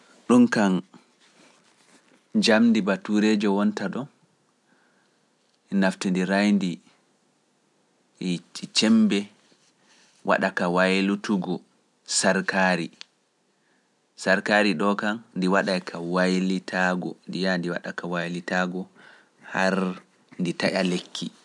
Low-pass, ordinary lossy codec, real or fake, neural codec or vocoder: none; none; real; none